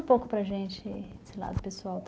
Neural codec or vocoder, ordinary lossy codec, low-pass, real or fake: none; none; none; real